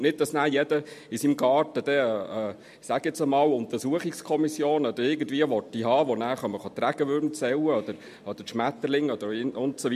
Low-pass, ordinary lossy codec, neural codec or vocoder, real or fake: 14.4 kHz; MP3, 64 kbps; none; real